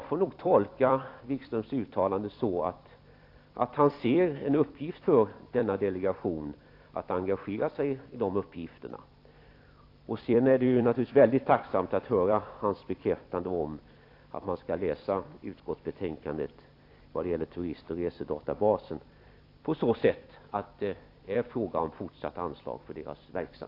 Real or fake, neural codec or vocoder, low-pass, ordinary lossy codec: fake; vocoder, 44.1 kHz, 128 mel bands every 256 samples, BigVGAN v2; 5.4 kHz; AAC, 32 kbps